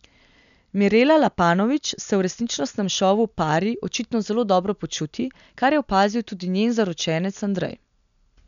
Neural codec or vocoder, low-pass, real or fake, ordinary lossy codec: none; 7.2 kHz; real; none